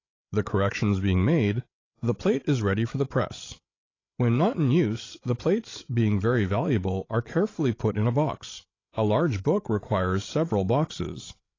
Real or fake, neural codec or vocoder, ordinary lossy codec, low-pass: fake; codec, 16 kHz, 16 kbps, FreqCodec, larger model; AAC, 32 kbps; 7.2 kHz